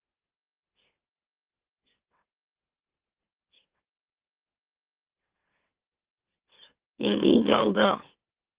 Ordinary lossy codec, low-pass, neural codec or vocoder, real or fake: Opus, 16 kbps; 3.6 kHz; autoencoder, 44.1 kHz, a latent of 192 numbers a frame, MeloTTS; fake